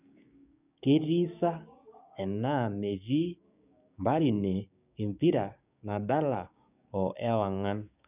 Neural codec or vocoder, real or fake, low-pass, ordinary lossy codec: codec, 16 kHz, 6 kbps, DAC; fake; 3.6 kHz; none